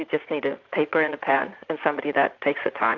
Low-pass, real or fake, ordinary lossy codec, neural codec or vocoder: 7.2 kHz; fake; AAC, 48 kbps; vocoder, 44.1 kHz, 80 mel bands, Vocos